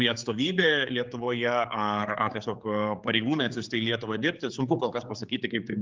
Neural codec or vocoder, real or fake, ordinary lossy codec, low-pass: codec, 16 kHz, 4 kbps, X-Codec, HuBERT features, trained on general audio; fake; Opus, 32 kbps; 7.2 kHz